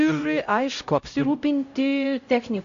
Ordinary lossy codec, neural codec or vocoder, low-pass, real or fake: AAC, 48 kbps; codec, 16 kHz, 0.5 kbps, X-Codec, HuBERT features, trained on LibriSpeech; 7.2 kHz; fake